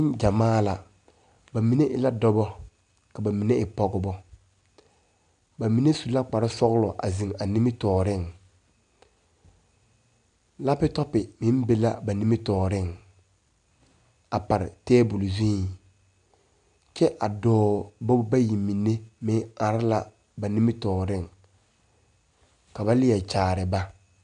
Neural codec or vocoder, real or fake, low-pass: none; real; 9.9 kHz